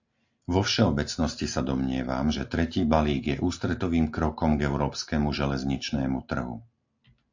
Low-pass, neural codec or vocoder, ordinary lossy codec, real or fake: 7.2 kHz; none; AAC, 48 kbps; real